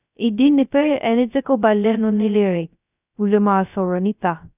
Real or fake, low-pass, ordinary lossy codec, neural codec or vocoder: fake; 3.6 kHz; none; codec, 16 kHz, 0.2 kbps, FocalCodec